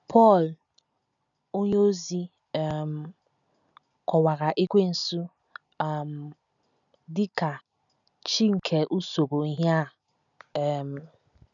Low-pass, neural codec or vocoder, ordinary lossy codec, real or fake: 7.2 kHz; none; none; real